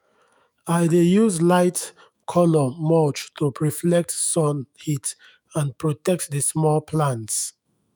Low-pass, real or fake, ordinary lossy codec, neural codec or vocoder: none; fake; none; autoencoder, 48 kHz, 128 numbers a frame, DAC-VAE, trained on Japanese speech